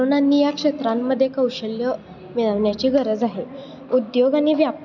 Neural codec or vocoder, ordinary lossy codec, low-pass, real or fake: none; none; 7.2 kHz; real